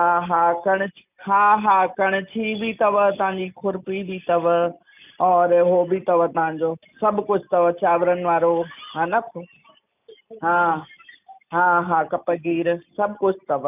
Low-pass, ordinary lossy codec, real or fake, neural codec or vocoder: 3.6 kHz; none; real; none